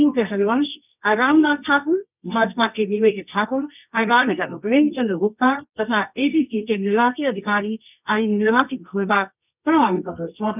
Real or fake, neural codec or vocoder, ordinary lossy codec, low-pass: fake; codec, 24 kHz, 0.9 kbps, WavTokenizer, medium music audio release; none; 3.6 kHz